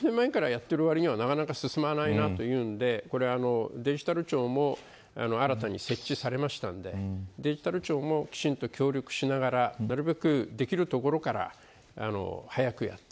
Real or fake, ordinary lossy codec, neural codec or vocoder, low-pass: real; none; none; none